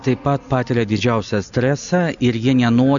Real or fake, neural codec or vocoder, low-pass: real; none; 7.2 kHz